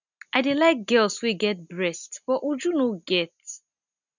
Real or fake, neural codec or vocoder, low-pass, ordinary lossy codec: real; none; 7.2 kHz; none